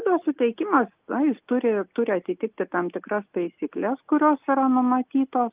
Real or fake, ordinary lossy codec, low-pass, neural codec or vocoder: real; Opus, 24 kbps; 3.6 kHz; none